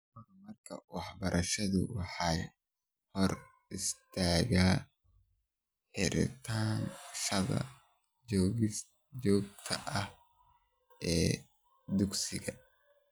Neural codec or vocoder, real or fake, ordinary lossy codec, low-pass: none; real; none; none